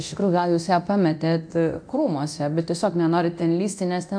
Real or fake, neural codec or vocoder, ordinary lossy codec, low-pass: fake; codec, 24 kHz, 0.9 kbps, DualCodec; MP3, 96 kbps; 9.9 kHz